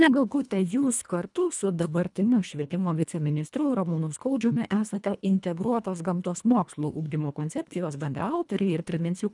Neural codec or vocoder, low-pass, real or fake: codec, 24 kHz, 1.5 kbps, HILCodec; 10.8 kHz; fake